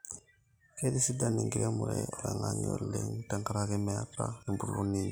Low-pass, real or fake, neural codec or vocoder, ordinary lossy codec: none; real; none; none